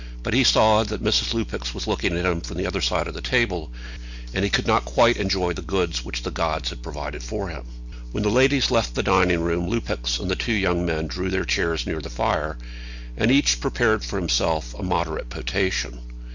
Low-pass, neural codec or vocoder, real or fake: 7.2 kHz; none; real